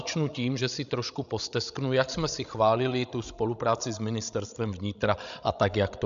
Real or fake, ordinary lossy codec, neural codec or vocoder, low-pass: fake; AAC, 96 kbps; codec, 16 kHz, 16 kbps, FreqCodec, larger model; 7.2 kHz